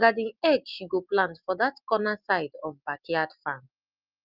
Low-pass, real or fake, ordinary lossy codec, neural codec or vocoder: 5.4 kHz; real; Opus, 24 kbps; none